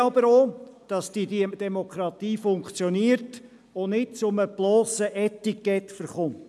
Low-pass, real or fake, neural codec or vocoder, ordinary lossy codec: none; real; none; none